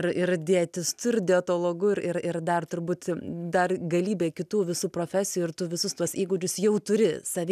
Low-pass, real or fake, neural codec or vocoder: 14.4 kHz; real; none